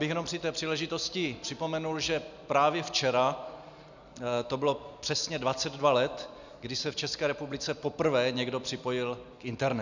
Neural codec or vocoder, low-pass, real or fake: none; 7.2 kHz; real